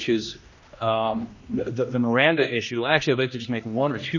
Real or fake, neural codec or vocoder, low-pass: fake; codec, 16 kHz, 1 kbps, X-Codec, HuBERT features, trained on general audio; 7.2 kHz